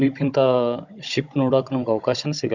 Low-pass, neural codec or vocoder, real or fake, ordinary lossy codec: 7.2 kHz; codec, 16 kHz, 16 kbps, FunCodec, trained on Chinese and English, 50 frames a second; fake; Opus, 64 kbps